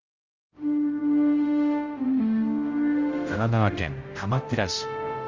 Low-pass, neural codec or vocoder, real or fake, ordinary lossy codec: 7.2 kHz; codec, 16 kHz, 0.5 kbps, X-Codec, HuBERT features, trained on general audio; fake; Opus, 32 kbps